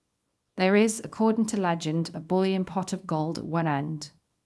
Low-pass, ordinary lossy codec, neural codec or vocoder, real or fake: none; none; codec, 24 kHz, 0.9 kbps, WavTokenizer, small release; fake